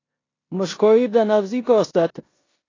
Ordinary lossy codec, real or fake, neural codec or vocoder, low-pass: AAC, 32 kbps; fake; codec, 16 kHz in and 24 kHz out, 0.9 kbps, LongCat-Audio-Codec, four codebook decoder; 7.2 kHz